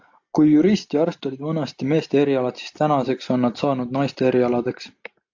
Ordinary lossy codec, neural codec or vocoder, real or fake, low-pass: AAC, 48 kbps; none; real; 7.2 kHz